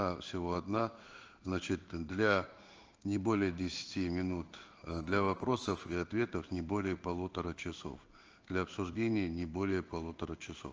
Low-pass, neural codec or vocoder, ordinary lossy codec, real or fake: 7.2 kHz; codec, 16 kHz in and 24 kHz out, 1 kbps, XY-Tokenizer; Opus, 24 kbps; fake